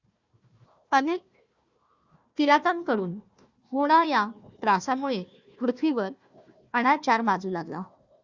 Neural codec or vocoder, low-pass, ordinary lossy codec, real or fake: codec, 16 kHz, 1 kbps, FunCodec, trained on Chinese and English, 50 frames a second; 7.2 kHz; Opus, 64 kbps; fake